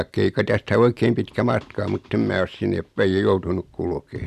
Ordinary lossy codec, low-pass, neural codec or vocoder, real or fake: none; 14.4 kHz; none; real